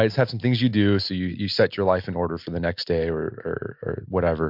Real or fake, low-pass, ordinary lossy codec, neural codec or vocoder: real; 5.4 kHz; MP3, 48 kbps; none